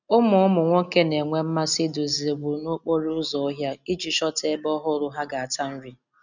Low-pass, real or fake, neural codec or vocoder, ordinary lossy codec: 7.2 kHz; real; none; none